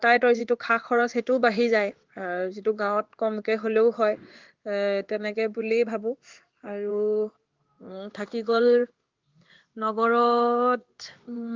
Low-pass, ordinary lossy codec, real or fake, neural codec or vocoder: 7.2 kHz; Opus, 32 kbps; fake; codec, 16 kHz in and 24 kHz out, 1 kbps, XY-Tokenizer